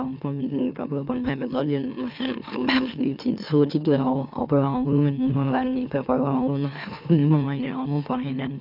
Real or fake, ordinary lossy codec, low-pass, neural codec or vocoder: fake; none; 5.4 kHz; autoencoder, 44.1 kHz, a latent of 192 numbers a frame, MeloTTS